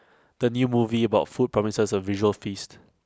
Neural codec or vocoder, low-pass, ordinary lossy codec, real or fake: none; none; none; real